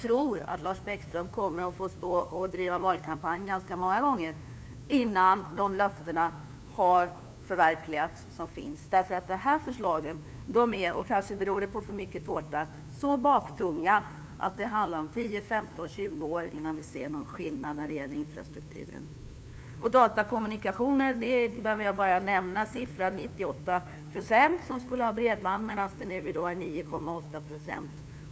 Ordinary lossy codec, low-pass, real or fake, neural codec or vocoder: none; none; fake; codec, 16 kHz, 2 kbps, FunCodec, trained on LibriTTS, 25 frames a second